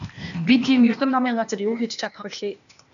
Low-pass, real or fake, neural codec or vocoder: 7.2 kHz; fake; codec, 16 kHz, 0.8 kbps, ZipCodec